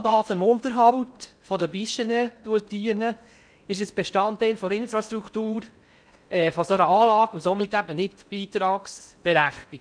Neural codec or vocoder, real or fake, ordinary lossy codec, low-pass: codec, 16 kHz in and 24 kHz out, 0.6 kbps, FocalCodec, streaming, 4096 codes; fake; none; 9.9 kHz